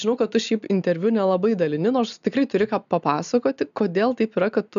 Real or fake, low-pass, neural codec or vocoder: real; 7.2 kHz; none